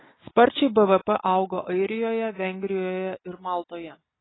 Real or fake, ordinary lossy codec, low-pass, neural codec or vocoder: real; AAC, 16 kbps; 7.2 kHz; none